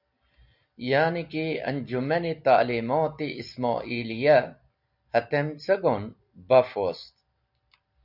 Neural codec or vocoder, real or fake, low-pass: none; real; 5.4 kHz